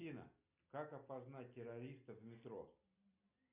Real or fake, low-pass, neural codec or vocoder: real; 3.6 kHz; none